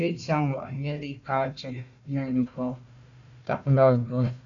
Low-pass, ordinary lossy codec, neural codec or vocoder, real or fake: 7.2 kHz; MP3, 96 kbps; codec, 16 kHz, 1 kbps, FunCodec, trained on Chinese and English, 50 frames a second; fake